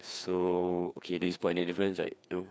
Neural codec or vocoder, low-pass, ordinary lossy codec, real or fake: codec, 16 kHz, 2 kbps, FreqCodec, larger model; none; none; fake